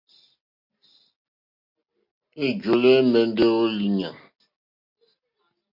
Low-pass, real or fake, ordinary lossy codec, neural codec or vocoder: 5.4 kHz; real; MP3, 32 kbps; none